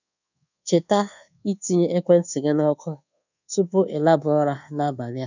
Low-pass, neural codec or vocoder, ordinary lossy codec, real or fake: 7.2 kHz; codec, 24 kHz, 1.2 kbps, DualCodec; none; fake